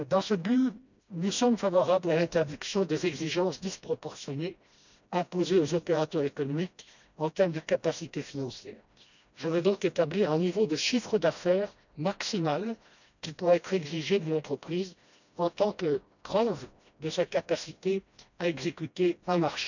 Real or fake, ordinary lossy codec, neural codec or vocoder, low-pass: fake; none; codec, 16 kHz, 1 kbps, FreqCodec, smaller model; 7.2 kHz